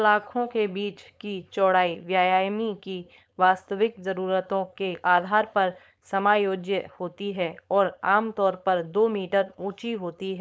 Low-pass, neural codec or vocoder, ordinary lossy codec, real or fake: none; codec, 16 kHz, 4.8 kbps, FACodec; none; fake